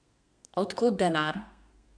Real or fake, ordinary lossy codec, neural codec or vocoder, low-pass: fake; none; codec, 32 kHz, 1.9 kbps, SNAC; 9.9 kHz